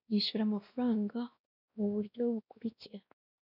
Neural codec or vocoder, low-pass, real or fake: codec, 16 kHz, 1 kbps, X-Codec, WavLM features, trained on Multilingual LibriSpeech; 5.4 kHz; fake